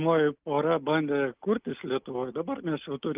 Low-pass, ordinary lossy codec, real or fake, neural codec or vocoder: 3.6 kHz; Opus, 24 kbps; real; none